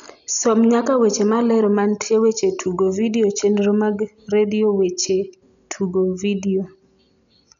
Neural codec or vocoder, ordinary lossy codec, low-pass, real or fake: none; none; 7.2 kHz; real